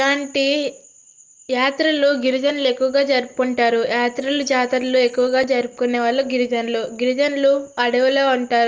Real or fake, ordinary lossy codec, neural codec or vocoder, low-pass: real; Opus, 24 kbps; none; 7.2 kHz